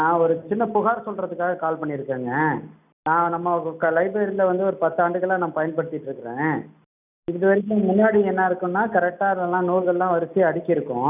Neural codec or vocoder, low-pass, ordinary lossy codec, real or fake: none; 3.6 kHz; none; real